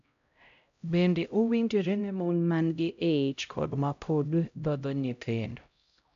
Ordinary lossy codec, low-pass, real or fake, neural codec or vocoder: MP3, 64 kbps; 7.2 kHz; fake; codec, 16 kHz, 0.5 kbps, X-Codec, HuBERT features, trained on LibriSpeech